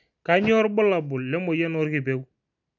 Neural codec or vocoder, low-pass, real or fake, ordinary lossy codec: none; 7.2 kHz; real; none